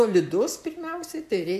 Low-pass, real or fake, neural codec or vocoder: 14.4 kHz; real; none